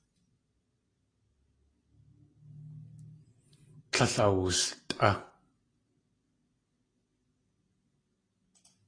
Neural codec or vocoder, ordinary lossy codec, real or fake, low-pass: none; AAC, 32 kbps; real; 9.9 kHz